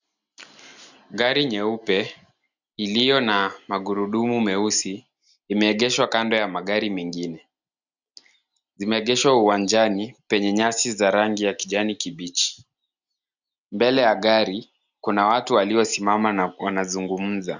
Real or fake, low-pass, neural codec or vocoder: real; 7.2 kHz; none